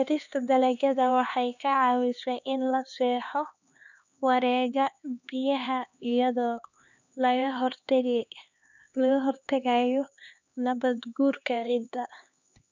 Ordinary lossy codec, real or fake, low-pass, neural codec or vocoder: none; fake; 7.2 kHz; codec, 16 kHz, 2 kbps, X-Codec, HuBERT features, trained on LibriSpeech